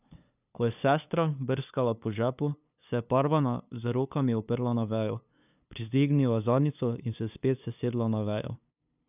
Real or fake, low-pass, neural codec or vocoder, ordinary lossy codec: fake; 3.6 kHz; codec, 16 kHz, 2 kbps, FunCodec, trained on LibriTTS, 25 frames a second; none